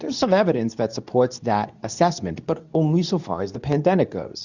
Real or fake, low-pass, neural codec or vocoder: fake; 7.2 kHz; codec, 24 kHz, 0.9 kbps, WavTokenizer, medium speech release version 2